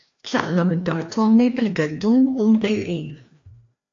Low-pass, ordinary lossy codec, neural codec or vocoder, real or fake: 7.2 kHz; MP3, 48 kbps; codec, 16 kHz, 1 kbps, FreqCodec, larger model; fake